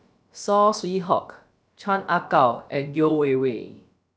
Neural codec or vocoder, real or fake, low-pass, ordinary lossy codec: codec, 16 kHz, about 1 kbps, DyCAST, with the encoder's durations; fake; none; none